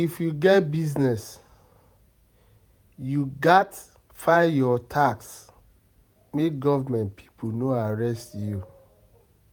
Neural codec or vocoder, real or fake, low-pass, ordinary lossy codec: vocoder, 48 kHz, 128 mel bands, Vocos; fake; none; none